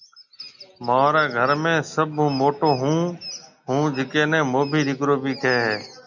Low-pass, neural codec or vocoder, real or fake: 7.2 kHz; none; real